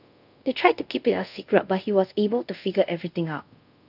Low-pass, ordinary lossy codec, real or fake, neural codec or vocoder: 5.4 kHz; none; fake; codec, 24 kHz, 0.5 kbps, DualCodec